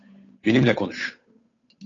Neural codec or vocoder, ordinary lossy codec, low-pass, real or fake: codec, 16 kHz, 8 kbps, FunCodec, trained on Chinese and English, 25 frames a second; AAC, 32 kbps; 7.2 kHz; fake